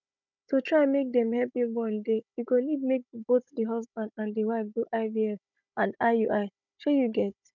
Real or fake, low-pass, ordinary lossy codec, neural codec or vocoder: fake; 7.2 kHz; none; codec, 16 kHz, 16 kbps, FunCodec, trained on Chinese and English, 50 frames a second